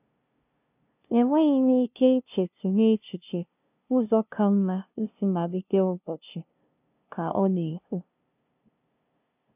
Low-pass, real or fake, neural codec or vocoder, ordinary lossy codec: 3.6 kHz; fake; codec, 16 kHz, 0.5 kbps, FunCodec, trained on LibriTTS, 25 frames a second; none